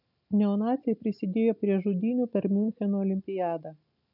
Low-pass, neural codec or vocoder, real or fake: 5.4 kHz; none; real